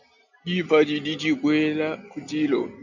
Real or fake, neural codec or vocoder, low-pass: real; none; 7.2 kHz